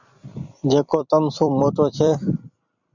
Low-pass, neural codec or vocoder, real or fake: 7.2 kHz; vocoder, 44.1 kHz, 128 mel bands every 256 samples, BigVGAN v2; fake